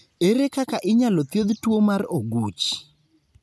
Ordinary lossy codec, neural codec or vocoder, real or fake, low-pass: none; none; real; none